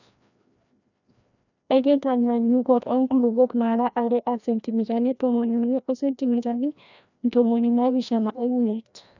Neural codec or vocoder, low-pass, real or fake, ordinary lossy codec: codec, 16 kHz, 1 kbps, FreqCodec, larger model; 7.2 kHz; fake; none